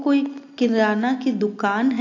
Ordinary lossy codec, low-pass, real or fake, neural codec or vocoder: AAC, 48 kbps; 7.2 kHz; real; none